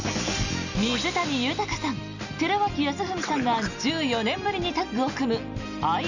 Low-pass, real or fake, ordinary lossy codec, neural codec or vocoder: 7.2 kHz; real; none; none